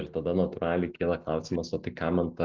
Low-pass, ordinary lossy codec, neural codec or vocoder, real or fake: 7.2 kHz; Opus, 24 kbps; none; real